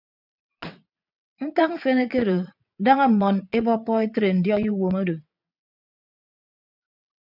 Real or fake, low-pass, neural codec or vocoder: fake; 5.4 kHz; vocoder, 22.05 kHz, 80 mel bands, WaveNeXt